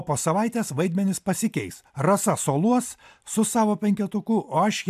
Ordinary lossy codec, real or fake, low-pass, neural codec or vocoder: AAC, 96 kbps; real; 14.4 kHz; none